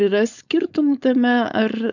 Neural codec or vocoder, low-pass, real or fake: codec, 16 kHz, 4.8 kbps, FACodec; 7.2 kHz; fake